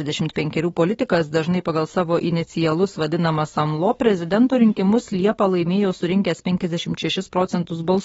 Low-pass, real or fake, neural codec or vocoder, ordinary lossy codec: 19.8 kHz; fake; codec, 44.1 kHz, 7.8 kbps, DAC; AAC, 24 kbps